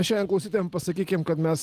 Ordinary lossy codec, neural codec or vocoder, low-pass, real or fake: Opus, 24 kbps; none; 14.4 kHz; real